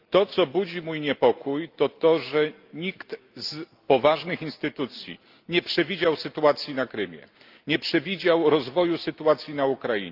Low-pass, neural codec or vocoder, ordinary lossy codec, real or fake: 5.4 kHz; none; Opus, 24 kbps; real